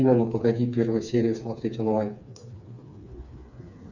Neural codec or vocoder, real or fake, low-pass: codec, 16 kHz, 4 kbps, FreqCodec, smaller model; fake; 7.2 kHz